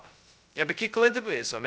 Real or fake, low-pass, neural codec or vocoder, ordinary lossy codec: fake; none; codec, 16 kHz, 0.2 kbps, FocalCodec; none